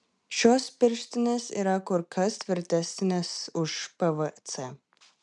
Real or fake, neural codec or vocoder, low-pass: real; none; 10.8 kHz